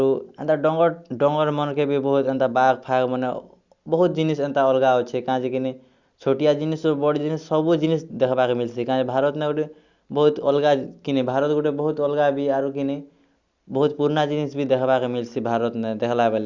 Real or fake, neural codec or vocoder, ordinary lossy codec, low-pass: real; none; Opus, 64 kbps; 7.2 kHz